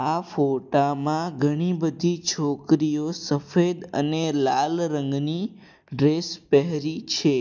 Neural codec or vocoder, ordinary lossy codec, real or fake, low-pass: none; none; real; 7.2 kHz